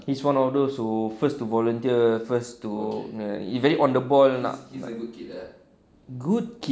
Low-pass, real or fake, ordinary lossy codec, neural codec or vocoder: none; real; none; none